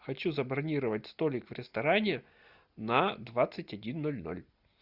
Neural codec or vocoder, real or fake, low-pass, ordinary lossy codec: none; real; 5.4 kHz; Opus, 64 kbps